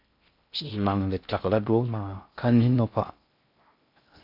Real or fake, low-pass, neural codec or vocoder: fake; 5.4 kHz; codec, 16 kHz in and 24 kHz out, 0.6 kbps, FocalCodec, streaming, 4096 codes